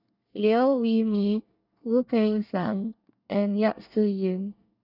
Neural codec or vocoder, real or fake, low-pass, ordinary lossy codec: codec, 24 kHz, 1 kbps, SNAC; fake; 5.4 kHz; none